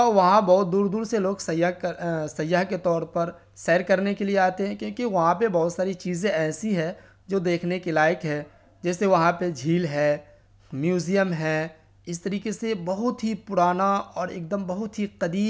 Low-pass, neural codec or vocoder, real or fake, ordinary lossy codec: none; none; real; none